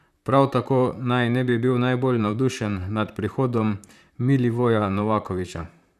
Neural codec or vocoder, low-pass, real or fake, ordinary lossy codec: vocoder, 44.1 kHz, 128 mel bands, Pupu-Vocoder; 14.4 kHz; fake; none